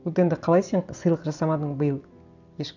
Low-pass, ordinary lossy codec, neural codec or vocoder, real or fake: 7.2 kHz; none; none; real